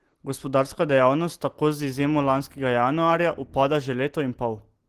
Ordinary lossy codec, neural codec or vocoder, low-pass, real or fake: Opus, 16 kbps; none; 14.4 kHz; real